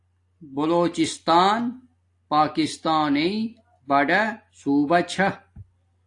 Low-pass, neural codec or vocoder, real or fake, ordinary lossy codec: 10.8 kHz; none; real; AAC, 48 kbps